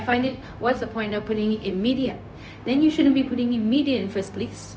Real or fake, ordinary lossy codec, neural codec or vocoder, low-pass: fake; none; codec, 16 kHz, 0.4 kbps, LongCat-Audio-Codec; none